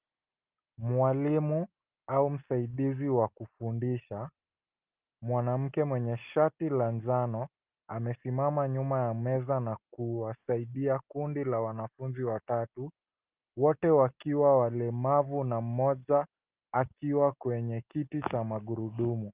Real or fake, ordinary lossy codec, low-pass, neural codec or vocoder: real; Opus, 24 kbps; 3.6 kHz; none